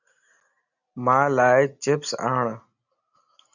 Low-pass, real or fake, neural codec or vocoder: 7.2 kHz; real; none